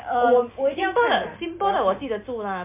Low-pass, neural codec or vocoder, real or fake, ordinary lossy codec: 3.6 kHz; none; real; MP3, 32 kbps